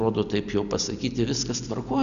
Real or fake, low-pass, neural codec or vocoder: real; 7.2 kHz; none